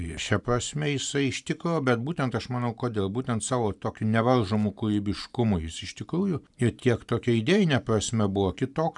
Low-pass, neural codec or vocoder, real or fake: 10.8 kHz; vocoder, 44.1 kHz, 128 mel bands every 512 samples, BigVGAN v2; fake